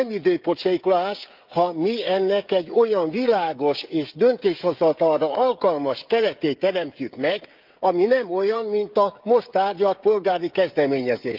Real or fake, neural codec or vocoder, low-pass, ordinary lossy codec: fake; codec, 16 kHz, 16 kbps, FreqCodec, smaller model; 5.4 kHz; Opus, 32 kbps